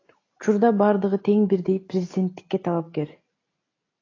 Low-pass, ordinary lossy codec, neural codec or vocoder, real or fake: 7.2 kHz; AAC, 32 kbps; none; real